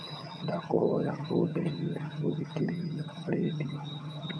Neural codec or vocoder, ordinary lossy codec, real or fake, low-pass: vocoder, 22.05 kHz, 80 mel bands, HiFi-GAN; none; fake; none